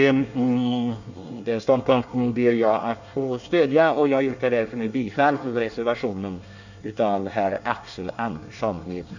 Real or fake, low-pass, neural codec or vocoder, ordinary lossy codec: fake; 7.2 kHz; codec, 24 kHz, 1 kbps, SNAC; none